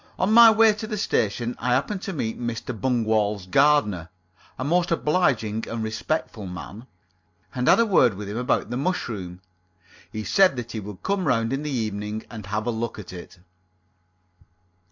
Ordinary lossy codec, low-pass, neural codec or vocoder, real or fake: MP3, 64 kbps; 7.2 kHz; none; real